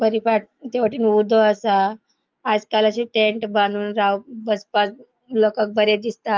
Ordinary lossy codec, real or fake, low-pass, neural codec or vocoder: Opus, 24 kbps; fake; 7.2 kHz; autoencoder, 48 kHz, 128 numbers a frame, DAC-VAE, trained on Japanese speech